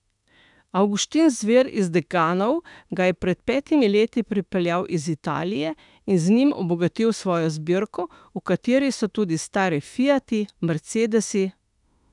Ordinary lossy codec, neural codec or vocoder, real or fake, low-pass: none; autoencoder, 48 kHz, 32 numbers a frame, DAC-VAE, trained on Japanese speech; fake; 10.8 kHz